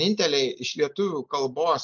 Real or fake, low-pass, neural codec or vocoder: real; 7.2 kHz; none